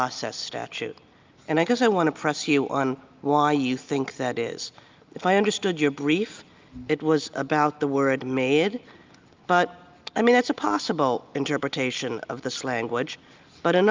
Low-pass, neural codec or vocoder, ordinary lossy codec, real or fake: 7.2 kHz; none; Opus, 24 kbps; real